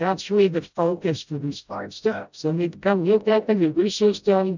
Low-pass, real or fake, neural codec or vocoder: 7.2 kHz; fake; codec, 16 kHz, 0.5 kbps, FreqCodec, smaller model